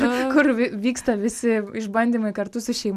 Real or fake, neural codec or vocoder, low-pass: real; none; 14.4 kHz